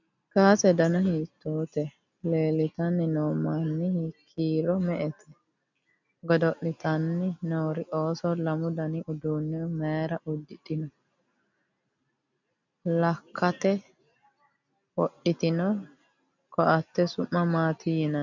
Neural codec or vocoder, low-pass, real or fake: none; 7.2 kHz; real